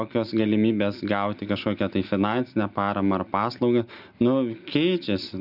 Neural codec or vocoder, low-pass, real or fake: none; 5.4 kHz; real